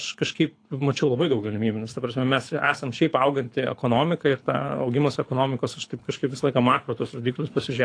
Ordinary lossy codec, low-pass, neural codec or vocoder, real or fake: AAC, 48 kbps; 9.9 kHz; codec, 24 kHz, 6 kbps, HILCodec; fake